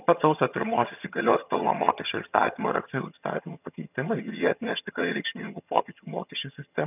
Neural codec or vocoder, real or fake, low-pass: vocoder, 22.05 kHz, 80 mel bands, HiFi-GAN; fake; 3.6 kHz